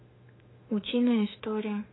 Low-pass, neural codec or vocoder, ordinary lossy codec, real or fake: 7.2 kHz; autoencoder, 48 kHz, 32 numbers a frame, DAC-VAE, trained on Japanese speech; AAC, 16 kbps; fake